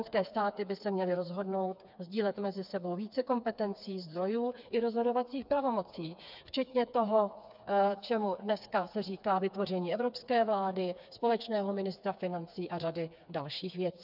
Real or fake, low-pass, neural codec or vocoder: fake; 5.4 kHz; codec, 16 kHz, 4 kbps, FreqCodec, smaller model